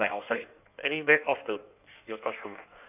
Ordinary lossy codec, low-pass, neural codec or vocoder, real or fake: none; 3.6 kHz; codec, 16 kHz in and 24 kHz out, 1.1 kbps, FireRedTTS-2 codec; fake